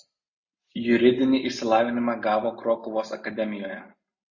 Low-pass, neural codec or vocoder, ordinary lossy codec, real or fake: 7.2 kHz; none; MP3, 32 kbps; real